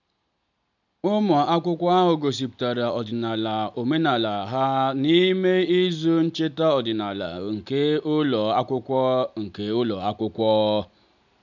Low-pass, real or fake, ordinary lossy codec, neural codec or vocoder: 7.2 kHz; real; none; none